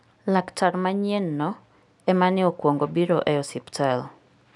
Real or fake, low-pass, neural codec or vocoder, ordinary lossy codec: real; 10.8 kHz; none; none